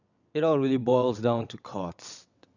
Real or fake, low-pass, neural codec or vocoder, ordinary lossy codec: fake; 7.2 kHz; vocoder, 22.05 kHz, 80 mel bands, WaveNeXt; none